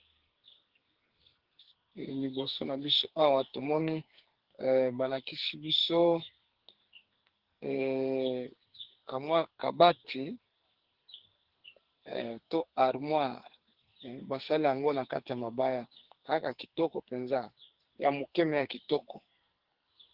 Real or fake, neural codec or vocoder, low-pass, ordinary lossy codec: fake; codec, 44.1 kHz, 2.6 kbps, SNAC; 5.4 kHz; Opus, 16 kbps